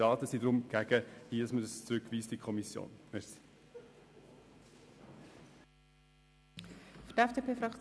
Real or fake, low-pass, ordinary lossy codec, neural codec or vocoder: real; none; none; none